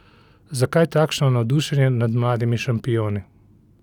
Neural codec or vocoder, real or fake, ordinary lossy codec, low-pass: none; real; none; 19.8 kHz